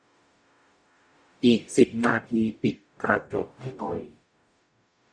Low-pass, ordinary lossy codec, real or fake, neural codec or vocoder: 9.9 kHz; none; fake; codec, 44.1 kHz, 0.9 kbps, DAC